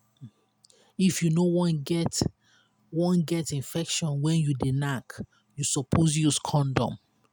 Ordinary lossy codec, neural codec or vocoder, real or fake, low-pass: none; none; real; none